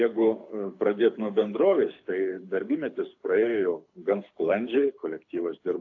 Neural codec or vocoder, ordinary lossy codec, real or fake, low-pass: codec, 24 kHz, 6 kbps, HILCodec; AAC, 48 kbps; fake; 7.2 kHz